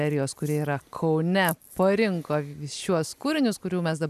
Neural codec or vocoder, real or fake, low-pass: none; real; 14.4 kHz